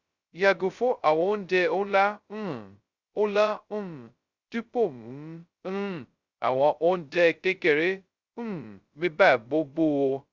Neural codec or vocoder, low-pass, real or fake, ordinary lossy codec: codec, 16 kHz, 0.2 kbps, FocalCodec; 7.2 kHz; fake; Opus, 64 kbps